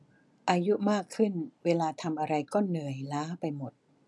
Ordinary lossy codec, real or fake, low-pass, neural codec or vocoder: none; real; none; none